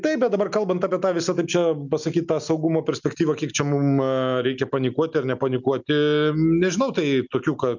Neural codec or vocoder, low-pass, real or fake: none; 7.2 kHz; real